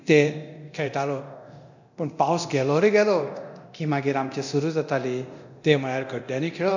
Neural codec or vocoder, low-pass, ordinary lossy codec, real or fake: codec, 24 kHz, 0.9 kbps, DualCodec; 7.2 kHz; none; fake